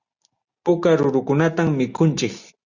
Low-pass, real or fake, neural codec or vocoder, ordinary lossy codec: 7.2 kHz; real; none; Opus, 64 kbps